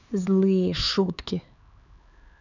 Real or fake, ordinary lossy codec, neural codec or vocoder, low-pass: fake; none; codec, 16 kHz, 4 kbps, X-Codec, HuBERT features, trained on LibriSpeech; 7.2 kHz